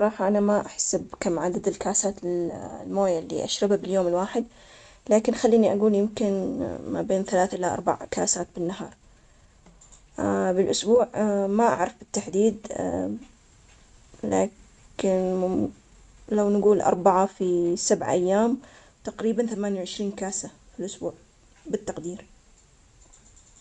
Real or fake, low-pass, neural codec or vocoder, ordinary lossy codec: real; 10.8 kHz; none; none